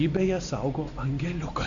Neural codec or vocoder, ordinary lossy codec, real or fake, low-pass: none; AAC, 64 kbps; real; 7.2 kHz